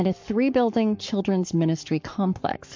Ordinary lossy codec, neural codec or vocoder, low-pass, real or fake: MP3, 48 kbps; codec, 44.1 kHz, 7.8 kbps, Pupu-Codec; 7.2 kHz; fake